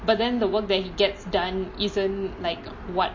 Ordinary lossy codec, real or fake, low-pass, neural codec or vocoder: MP3, 32 kbps; real; 7.2 kHz; none